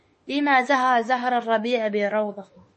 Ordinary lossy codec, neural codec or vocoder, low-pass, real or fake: MP3, 32 kbps; autoencoder, 48 kHz, 32 numbers a frame, DAC-VAE, trained on Japanese speech; 10.8 kHz; fake